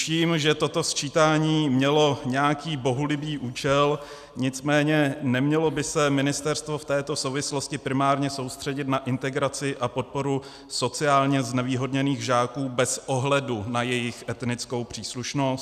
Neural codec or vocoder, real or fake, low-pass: none; real; 14.4 kHz